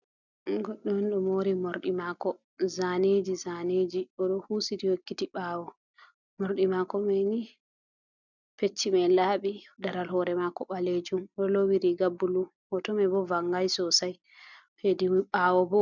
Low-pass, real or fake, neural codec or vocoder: 7.2 kHz; real; none